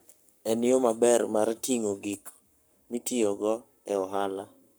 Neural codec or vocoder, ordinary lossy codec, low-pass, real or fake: codec, 44.1 kHz, 7.8 kbps, Pupu-Codec; none; none; fake